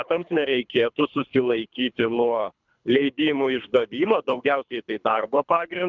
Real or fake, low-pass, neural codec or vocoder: fake; 7.2 kHz; codec, 24 kHz, 3 kbps, HILCodec